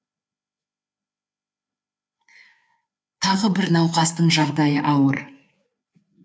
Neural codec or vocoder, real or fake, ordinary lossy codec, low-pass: codec, 16 kHz, 4 kbps, FreqCodec, larger model; fake; none; none